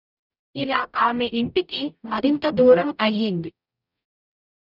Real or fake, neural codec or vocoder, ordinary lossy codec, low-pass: fake; codec, 44.1 kHz, 0.9 kbps, DAC; none; 5.4 kHz